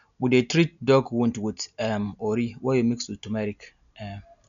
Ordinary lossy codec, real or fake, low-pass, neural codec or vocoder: none; real; 7.2 kHz; none